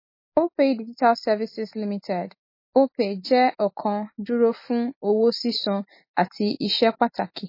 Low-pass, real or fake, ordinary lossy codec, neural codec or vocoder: 5.4 kHz; real; MP3, 24 kbps; none